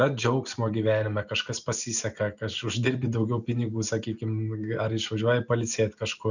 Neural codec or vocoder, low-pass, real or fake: none; 7.2 kHz; real